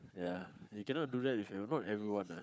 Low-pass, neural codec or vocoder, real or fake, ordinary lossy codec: none; codec, 16 kHz, 4 kbps, FunCodec, trained on Chinese and English, 50 frames a second; fake; none